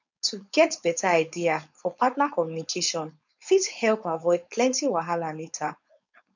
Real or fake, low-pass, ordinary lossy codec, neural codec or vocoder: fake; 7.2 kHz; none; codec, 16 kHz, 4.8 kbps, FACodec